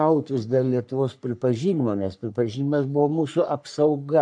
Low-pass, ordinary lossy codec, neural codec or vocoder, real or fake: 9.9 kHz; MP3, 96 kbps; codec, 44.1 kHz, 3.4 kbps, Pupu-Codec; fake